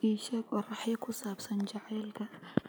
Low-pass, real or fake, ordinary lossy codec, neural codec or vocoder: none; real; none; none